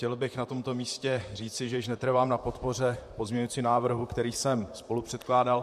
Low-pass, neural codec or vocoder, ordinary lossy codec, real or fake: 14.4 kHz; vocoder, 44.1 kHz, 128 mel bands, Pupu-Vocoder; MP3, 64 kbps; fake